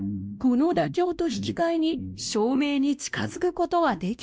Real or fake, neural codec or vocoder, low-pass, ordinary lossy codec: fake; codec, 16 kHz, 1 kbps, X-Codec, WavLM features, trained on Multilingual LibriSpeech; none; none